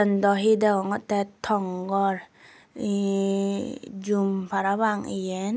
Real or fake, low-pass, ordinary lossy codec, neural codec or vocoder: real; none; none; none